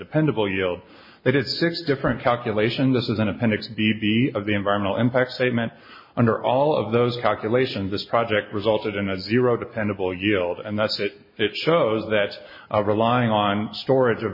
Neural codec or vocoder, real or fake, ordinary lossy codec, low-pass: none; real; MP3, 24 kbps; 5.4 kHz